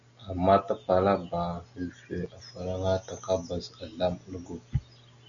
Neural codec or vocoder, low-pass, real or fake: none; 7.2 kHz; real